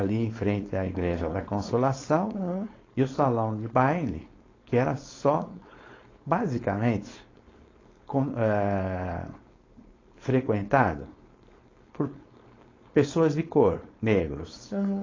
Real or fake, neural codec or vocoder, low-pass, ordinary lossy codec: fake; codec, 16 kHz, 4.8 kbps, FACodec; 7.2 kHz; AAC, 32 kbps